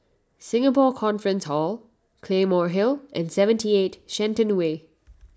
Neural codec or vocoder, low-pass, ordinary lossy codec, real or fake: none; none; none; real